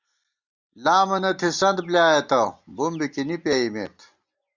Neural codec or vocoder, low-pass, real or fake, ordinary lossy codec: none; 7.2 kHz; real; Opus, 64 kbps